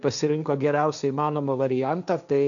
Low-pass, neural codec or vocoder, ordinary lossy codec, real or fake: 7.2 kHz; codec, 16 kHz, 1.1 kbps, Voila-Tokenizer; MP3, 96 kbps; fake